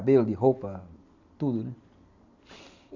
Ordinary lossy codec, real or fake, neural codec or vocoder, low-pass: none; real; none; 7.2 kHz